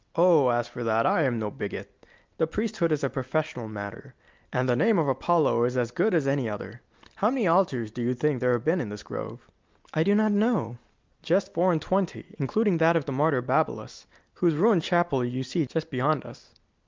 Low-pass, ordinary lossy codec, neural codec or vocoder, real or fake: 7.2 kHz; Opus, 24 kbps; none; real